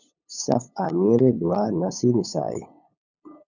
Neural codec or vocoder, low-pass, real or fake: codec, 16 kHz, 8 kbps, FunCodec, trained on LibriTTS, 25 frames a second; 7.2 kHz; fake